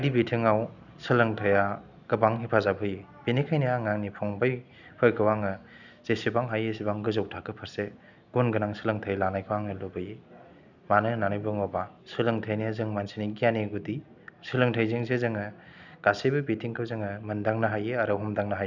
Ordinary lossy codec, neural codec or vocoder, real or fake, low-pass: none; none; real; 7.2 kHz